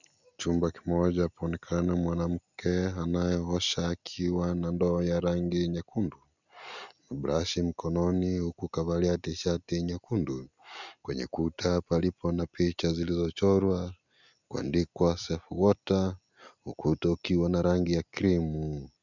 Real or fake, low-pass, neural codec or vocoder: real; 7.2 kHz; none